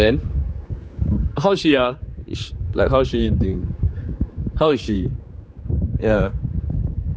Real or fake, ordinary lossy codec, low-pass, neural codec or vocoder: fake; none; none; codec, 16 kHz, 4 kbps, X-Codec, HuBERT features, trained on general audio